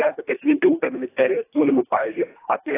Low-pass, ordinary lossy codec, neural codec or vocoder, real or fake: 3.6 kHz; AAC, 16 kbps; codec, 24 kHz, 1.5 kbps, HILCodec; fake